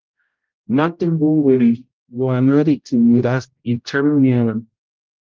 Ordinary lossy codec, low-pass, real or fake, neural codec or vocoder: Opus, 32 kbps; 7.2 kHz; fake; codec, 16 kHz, 0.5 kbps, X-Codec, HuBERT features, trained on general audio